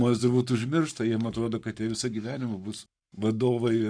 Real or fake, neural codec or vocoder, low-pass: fake; codec, 44.1 kHz, 7.8 kbps, Pupu-Codec; 9.9 kHz